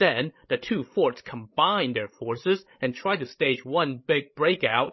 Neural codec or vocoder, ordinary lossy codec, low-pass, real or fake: codec, 16 kHz, 16 kbps, FreqCodec, larger model; MP3, 24 kbps; 7.2 kHz; fake